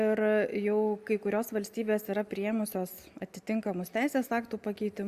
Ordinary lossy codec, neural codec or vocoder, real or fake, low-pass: Opus, 64 kbps; none; real; 14.4 kHz